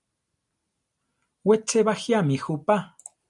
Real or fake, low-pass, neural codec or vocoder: real; 10.8 kHz; none